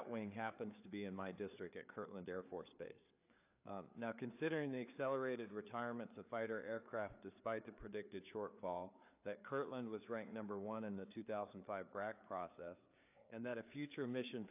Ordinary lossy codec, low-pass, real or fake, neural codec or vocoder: AAC, 32 kbps; 3.6 kHz; fake; codec, 16 kHz, 4 kbps, FreqCodec, larger model